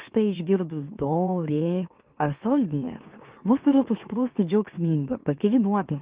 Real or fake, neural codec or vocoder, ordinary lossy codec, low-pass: fake; autoencoder, 44.1 kHz, a latent of 192 numbers a frame, MeloTTS; Opus, 24 kbps; 3.6 kHz